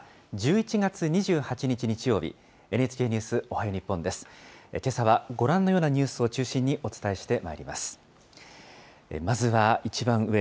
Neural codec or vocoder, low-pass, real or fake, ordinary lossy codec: none; none; real; none